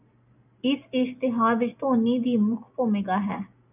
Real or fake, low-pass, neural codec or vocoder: real; 3.6 kHz; none